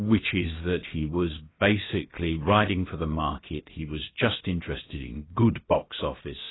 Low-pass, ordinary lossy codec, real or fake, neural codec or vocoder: 7.2 kHz; AAC, 16 kbps; fake; codec, 16 kHz, about 1 kbps, DyCAST, with the encoder's durations